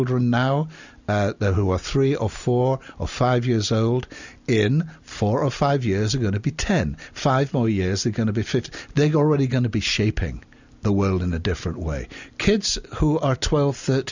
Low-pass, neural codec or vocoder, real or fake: 7.2 kHz; none; real